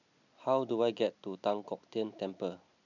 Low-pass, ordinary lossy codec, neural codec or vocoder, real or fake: 7.2 kHz; none; none; real